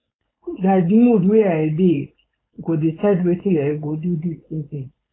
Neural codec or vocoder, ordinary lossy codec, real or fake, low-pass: codec, 16 kHz, 4.8 kbps, FACodec; AAC, 16 kbps; fake; 7.2 kHz